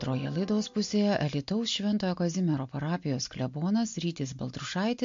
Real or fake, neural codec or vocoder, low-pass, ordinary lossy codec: real; none; 7.2 kHz; MP3, 48 kbps